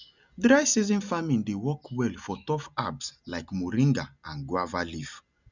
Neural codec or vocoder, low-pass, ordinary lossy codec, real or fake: none; 7.2 kHz; none; real